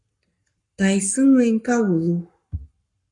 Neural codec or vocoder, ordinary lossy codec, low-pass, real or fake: codec, 44.1 kHz, 7.8 kbps, Pupu-Codec; AAC, 64 kbps; 10.8 kHz; fake